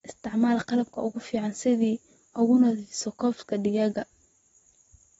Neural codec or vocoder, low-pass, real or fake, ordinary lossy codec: vocoder, 44.1 kHz, 128 mel bands every 512 samples, BigVGAN v2; 19.8 kHz; fake; AAC, 24 kbps